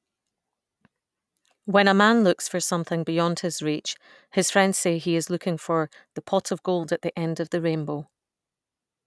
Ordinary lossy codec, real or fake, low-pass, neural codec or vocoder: none; real; none; none